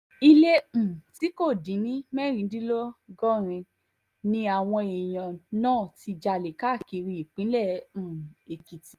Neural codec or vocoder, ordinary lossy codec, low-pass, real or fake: none; Opus, 32 kbps; 14.4 kHz; real